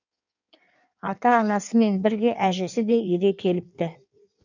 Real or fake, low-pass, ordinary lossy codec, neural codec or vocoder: fake; 7.2 kHz; none; codec, 16 kHz in and 24 kHz out, 1.1 kbps, FireRedTTS-2 codec